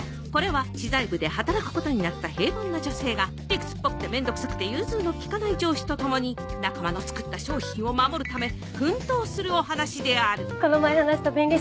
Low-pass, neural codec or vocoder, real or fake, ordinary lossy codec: none; none; real; none